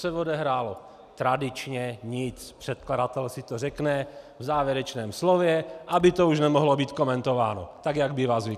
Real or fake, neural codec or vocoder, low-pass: real; none; 14.4 kHz